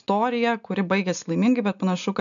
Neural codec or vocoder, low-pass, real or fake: none; 7.2 kHz; real